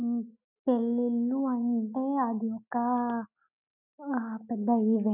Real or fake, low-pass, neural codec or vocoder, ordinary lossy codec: real; 3.6 kHz; none; none